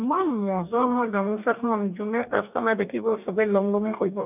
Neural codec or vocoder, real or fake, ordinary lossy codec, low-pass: codec, 44.1 kHz, 2.6 kbps, DAC; fake; none; 3.6 kHz